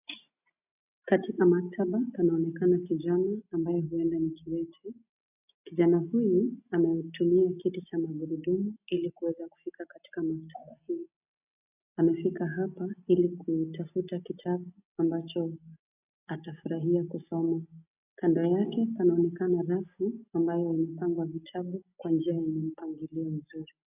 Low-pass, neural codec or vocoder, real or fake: 3.6 kHz; none; real